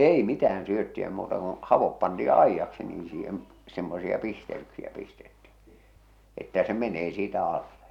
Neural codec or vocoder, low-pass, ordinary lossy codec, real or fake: none; 19.8 kHz; none; real